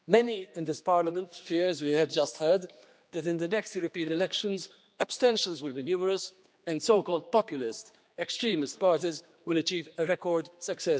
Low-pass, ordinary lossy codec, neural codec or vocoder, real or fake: none; none; codec, 16 kHz, 2 kbps, X-Codec, HuBERT features, trained on general audio; fake